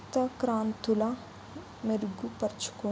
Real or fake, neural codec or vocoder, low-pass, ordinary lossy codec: real; none; none; none